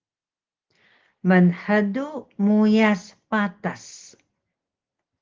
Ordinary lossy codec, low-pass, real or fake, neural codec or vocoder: Opus, 16 kbps; 7.2 kHz; real; none